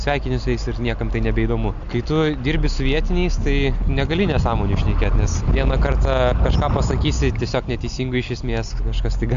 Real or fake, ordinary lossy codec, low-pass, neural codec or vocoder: real; MP3, 64 kbps; 7.2 kHz; none